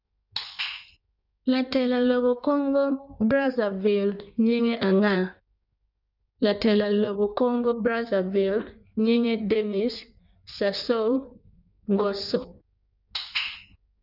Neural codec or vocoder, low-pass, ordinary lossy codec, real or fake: codec, 16 kHz in and 24 kHz out, 1.1 kbps, FireRedTTS-2 codec; 5.4 kHz; none; fake